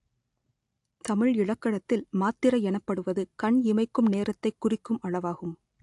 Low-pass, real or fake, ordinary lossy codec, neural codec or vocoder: 10.8 kHz; real; AAC, 64 kbps; none